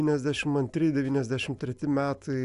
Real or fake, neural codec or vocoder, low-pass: real; none; 10.8 kHz